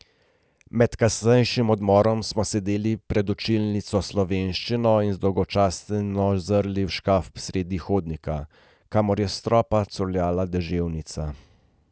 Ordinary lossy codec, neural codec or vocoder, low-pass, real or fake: none; none; none; real